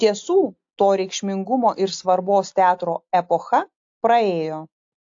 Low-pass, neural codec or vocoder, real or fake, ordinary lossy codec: 7.2 kHz; none; real; AAC, 48 kbps